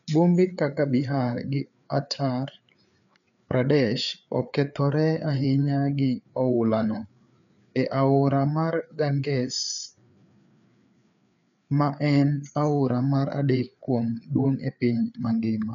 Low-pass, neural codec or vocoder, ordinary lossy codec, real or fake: 7.2 kHz; codec, 16 kHz, 4 kbps, FreqCodec, larger model; none; fake